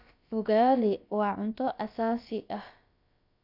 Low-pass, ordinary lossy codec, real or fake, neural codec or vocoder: 5.4 kHz; none; fake; codec, 16 kHz, about 1 kbps, DyCAST, with the encoder's durations